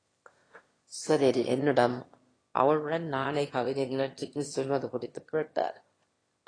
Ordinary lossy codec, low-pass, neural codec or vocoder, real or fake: AAC, 32 kbps; 9.9 kHz; autoencoder, 22.05 kHz, a latent of 192 numbers a frame, VITS, trained on one speaker; fake